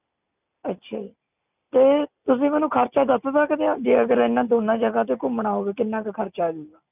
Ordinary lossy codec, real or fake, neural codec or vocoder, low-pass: none; real; none; 3.6 kHz